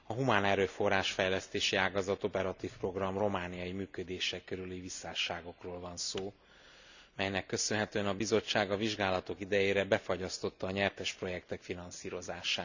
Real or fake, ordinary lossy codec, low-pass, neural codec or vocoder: real; none; 7.2 kHz; none